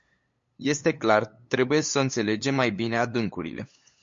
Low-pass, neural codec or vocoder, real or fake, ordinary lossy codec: 7.2 kHz; codec, 16 kHz, 8 kbps, FunCodec, trained on LibriTTS, 25 frames a second; fake; MP3, 48 kbps